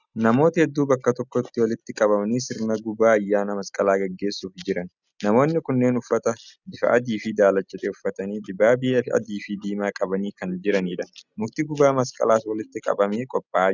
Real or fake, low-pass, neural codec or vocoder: real; 7.2 kHz; none